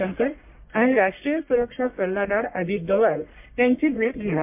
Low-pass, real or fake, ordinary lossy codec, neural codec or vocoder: 3.6 kHz; fake; MP3, 32 kbps; codec, 44.1 kHz, 1.7 kbps, Pupu-Codec